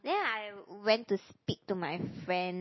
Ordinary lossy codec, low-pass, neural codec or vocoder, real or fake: MP3, 24 kbps; 7.2 kHz; none; real